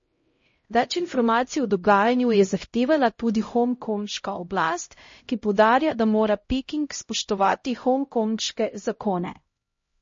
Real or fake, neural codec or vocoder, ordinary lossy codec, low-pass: fake; codec, 16 kHz, 0.5 kbps, X-Codec, HuBERT features, trained on LibriSpeech; MP3, 32 kbps; 7.2 kHz